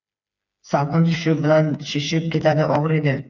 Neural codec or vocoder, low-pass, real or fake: codec, 16 kHz, 4 kbps, FreqCodec, smaller model; 7.2 kHz; fake